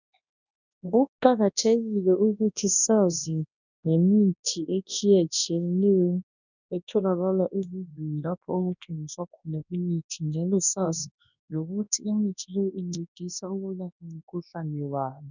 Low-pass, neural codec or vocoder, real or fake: 7.2 kHz; codec, 24 kHz, 0.9 kbps, WavTokenizer, large speech release; fake